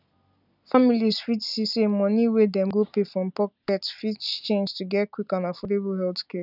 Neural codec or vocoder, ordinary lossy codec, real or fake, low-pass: none; none; real; 5.4 kHz